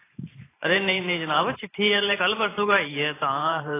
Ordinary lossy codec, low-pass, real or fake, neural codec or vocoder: AAC, 16 kbps; 3.6 kHz; fake; vocoder, 44.1 kHz, 128 mel bands every 512 samples, BigVGAN v2